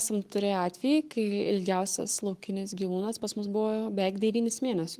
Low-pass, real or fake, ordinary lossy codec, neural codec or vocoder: 14.4 kHz; fake; Opus, 24 kbps; codec, 44.1 kHz, 7.8 kbps, Pupu-Codec